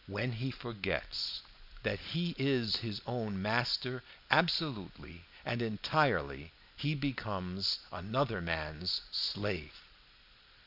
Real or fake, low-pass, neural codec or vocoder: real; 5.4 kHz; none